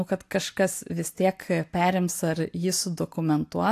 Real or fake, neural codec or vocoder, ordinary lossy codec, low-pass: real; none; AAC, 64 kbps; 14.4 kHz